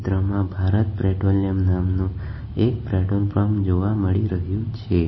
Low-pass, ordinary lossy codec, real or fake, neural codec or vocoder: 7.2 kHz; MP3, 24 kbps; real; none